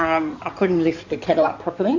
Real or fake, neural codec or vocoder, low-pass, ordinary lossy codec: fake; codec, 16 kHz in and 24 kHz out, 2.2 kbps, FireRedTTS-2 codec; 7.2 kHz; AAC, 48 kbps